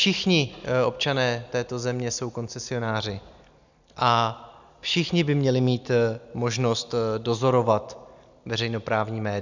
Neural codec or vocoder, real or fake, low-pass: none; real; 7.2 kHz